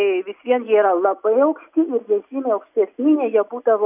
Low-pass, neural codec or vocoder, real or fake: 3.6 kHz; none; real